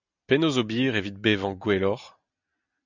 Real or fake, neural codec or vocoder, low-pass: real; none; 7.2 kHz